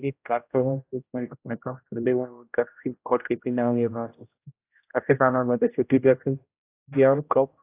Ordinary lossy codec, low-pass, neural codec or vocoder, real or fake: AAC, 24 kbps; 3.6 kHz; codec, 16 kHz, 0.5 kbps, X-Codec, HuBERT features, trained on general audio; fake